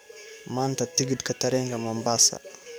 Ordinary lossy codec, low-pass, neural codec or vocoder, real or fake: none; none; codec, 44.1 kHz, 7.8 kbps, DAC; fake